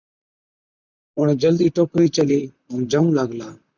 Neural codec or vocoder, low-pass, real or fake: vocoder, 44.1 kHz, 128 mel bands, Pupu-Vocoder; 7.2 kHz; fake